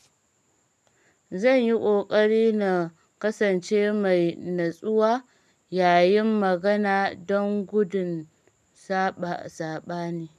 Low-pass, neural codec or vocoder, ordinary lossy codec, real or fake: 14.4 kHz; none; none; real